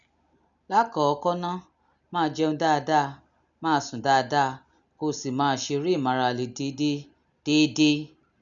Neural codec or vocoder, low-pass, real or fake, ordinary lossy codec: none; 7.2 kHz; real; none